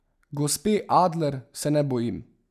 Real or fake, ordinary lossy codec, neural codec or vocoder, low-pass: real; none; none; 14.4 kHz